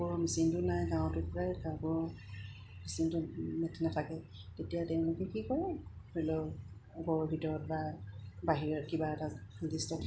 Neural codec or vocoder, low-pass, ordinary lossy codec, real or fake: none; none; none; real